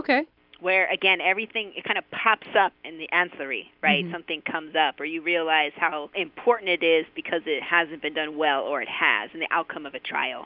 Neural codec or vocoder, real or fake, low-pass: none; real; 5.4 kHz